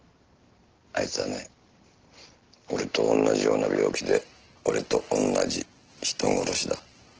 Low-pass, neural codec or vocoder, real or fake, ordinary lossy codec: 7.2 kHz; none; real; Opus, 16 kbps